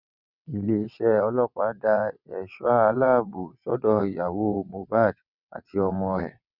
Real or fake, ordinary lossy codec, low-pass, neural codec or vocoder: fake; none; 5.4 kHz; vocoder, 22.05 kHz, 80 mel bands, WaveNeXt